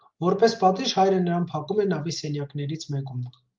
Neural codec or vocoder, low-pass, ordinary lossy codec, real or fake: none; 7.2 kHz; Opus, 32 kbps; real